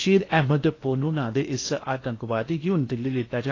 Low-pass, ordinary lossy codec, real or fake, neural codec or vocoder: 7.2 kHz; AAC, 32 kbps; fake; codec, 16 kHz in and 24 kHz out, 0.8 kbps, FocalCodec, streaming, 65536 codes